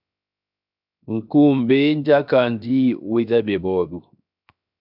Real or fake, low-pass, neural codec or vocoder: fake; 5.4 kHz; codec, 16 kHz, 0.7 kbps, FocalCodec